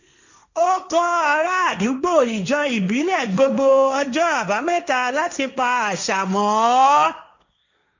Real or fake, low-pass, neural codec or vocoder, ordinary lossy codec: fake; 7.2 kHz; codec, 16 kHz, 1.1 kbps, Voila-Tokenizer; none